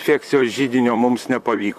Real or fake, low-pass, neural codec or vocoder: fake; 14.4 kHz; vocoder, 44.1 kHz, 128 mel bands, Pupu-Vocoder